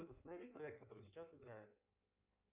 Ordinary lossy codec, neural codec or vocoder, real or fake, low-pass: Opus, 64 kbps; codec, 16 kHz in and 24 kHz out, 1.1 kbps, FireRedTTS-2 codec; fake; 3.6 kHz